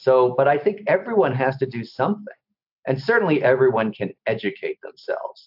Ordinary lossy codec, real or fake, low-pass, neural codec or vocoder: AAC, 48 kbps; real; 5.4 kHz; none